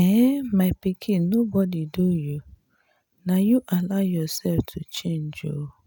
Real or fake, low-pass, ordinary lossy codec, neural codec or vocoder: real; none; none; none